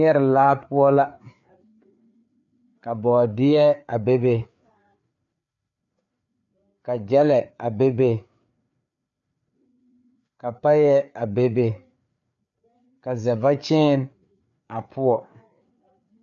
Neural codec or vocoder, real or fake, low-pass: codec, 16 kHz, 4 kbps, FreqCodec, larger model; fake; 7.2 kHz